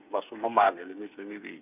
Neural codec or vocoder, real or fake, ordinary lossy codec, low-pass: codec, 16 kHz, 8 kbps, FreqCodec, smaller model; fake; none; 3.6 kHz